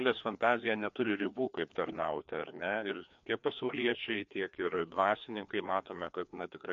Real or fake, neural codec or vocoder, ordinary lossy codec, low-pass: fake; codec, 16 kHz, 2 kbps, FreqCodec, larger model; MP3, 48 kbps; 7.2 kHz